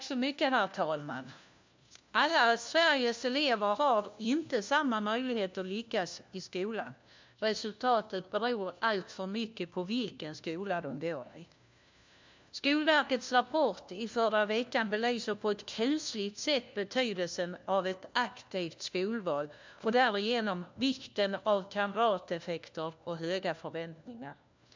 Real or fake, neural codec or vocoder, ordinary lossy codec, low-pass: fake; codec, 16 kHz, 1 kbps, FunCodec, trained on LibriTTS, 50 frames a second; MP3, 64 kbps; 7.2 kHz